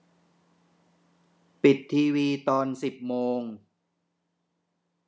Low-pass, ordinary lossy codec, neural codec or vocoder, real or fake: none; none; none; real